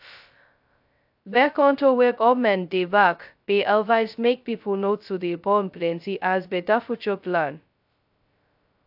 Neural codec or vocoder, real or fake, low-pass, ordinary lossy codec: codec, 16 kHz, 0.2 kbps, FocalCodec; fake; 5.4 kHz; AAC, 48 kbps